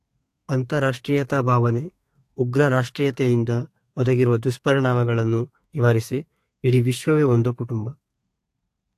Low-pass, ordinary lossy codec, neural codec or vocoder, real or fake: 14.4 kHz; AAC, 64 kbps; codec, 32 kHz, 1.9 kbps, SNAC; fake